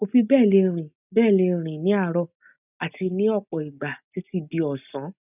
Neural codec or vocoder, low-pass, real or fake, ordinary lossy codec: none; 3.6 kHz; real; none